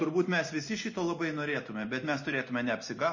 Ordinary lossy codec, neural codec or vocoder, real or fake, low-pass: MP3, 32 kbps; none; real; 7.2 kHz